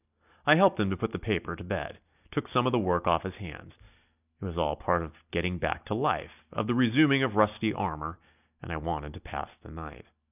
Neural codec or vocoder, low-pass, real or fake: none; 3.6 kHz; real